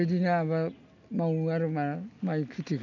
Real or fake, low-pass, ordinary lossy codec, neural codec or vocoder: real; 7.2 kHz; none; none